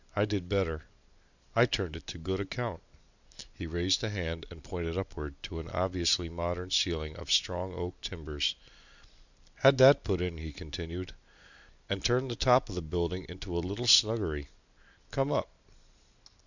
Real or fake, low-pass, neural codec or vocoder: real; 7.2 kHz; none